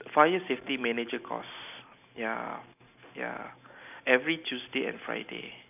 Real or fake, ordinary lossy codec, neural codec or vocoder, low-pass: real; none; none; 3.6 kHz